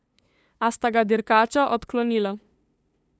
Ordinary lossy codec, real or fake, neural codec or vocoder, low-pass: none; fake; codec, 16 kHz, 2 kbps, FunCodec, trained on LibriTTS, 25 frames a second; none